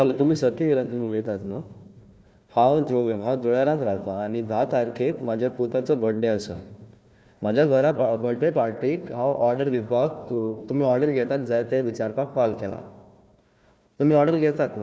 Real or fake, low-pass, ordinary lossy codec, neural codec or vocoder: fake; none; none; codec, 16 kHz, 1 kbps, FunCodec, trained on Chinese and English, 50 frames a second